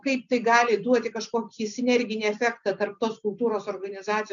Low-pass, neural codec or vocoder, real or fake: 7.2 kHz; none; real